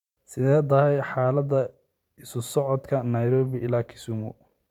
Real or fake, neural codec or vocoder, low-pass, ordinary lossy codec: fake; vocoder, 44.1 kHz, 128 mel bands every 512 samples, BigVGAN v2; 19.8 kHz; none